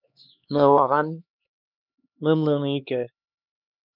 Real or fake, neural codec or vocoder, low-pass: fake; codec, 16 kHz, 2 kbps, X-Codec, HuBERT features, trained on LibriSpeech; 5.4 kHz